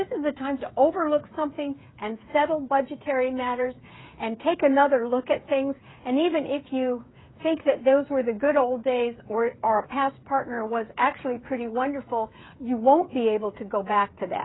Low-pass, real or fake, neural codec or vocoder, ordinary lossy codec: 7.2 kHz; fake; codec, 16 kHz, 8 kbps, FreqCodec, smaller model; AAC, 16 kbps